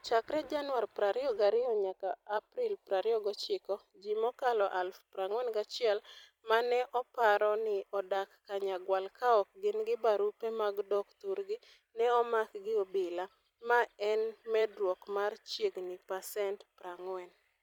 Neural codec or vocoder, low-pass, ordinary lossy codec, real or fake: vocoder, 44.1 kHz, 128 mel bands every 256 samples, BigVGAN v2; 19.8 kHz; none; fake